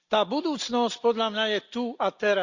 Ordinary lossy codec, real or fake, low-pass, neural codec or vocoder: Opus, 64 kbps; real; 7.2 kHz; none